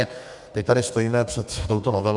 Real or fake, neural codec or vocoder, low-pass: fake; codec, 32 kHz, 1.9 kbps, SNAC; 10.8 kHz